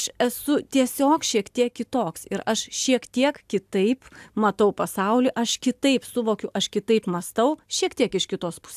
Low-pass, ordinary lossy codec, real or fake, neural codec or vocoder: 14.4 kHz; AAC, 96 kbps; real; none